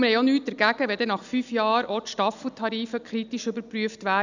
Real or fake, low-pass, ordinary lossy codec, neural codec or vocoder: real; 7.2 kHz; none; none